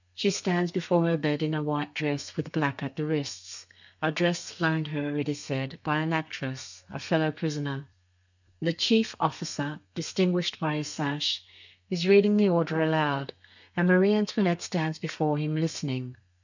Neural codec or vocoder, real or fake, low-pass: codec, 32 kHz, 1.9 kbps, SNAC; fake; 7.2 kHz